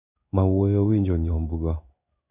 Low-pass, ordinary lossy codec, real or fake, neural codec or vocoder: 3.6 kHz; none; fake; codec, 16 kHz in and 24 kHz out, 1 kbps, XY-Tokenizer